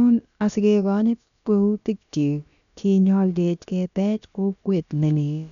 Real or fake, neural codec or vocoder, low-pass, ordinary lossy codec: fake; codec, 16 kHz, about 1 kbps, DyCAST, with the encoder's durations; 7.2 kHz; none